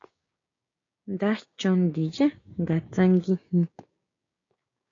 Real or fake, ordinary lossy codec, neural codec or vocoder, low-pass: fake; AAC, 32 kbps; codec, 16 kHz, 6 kbps, DAC; 7.2 kHz